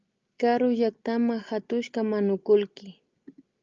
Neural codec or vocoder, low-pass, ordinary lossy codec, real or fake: none; 7.2 kHz; Opus, 24 kbps; real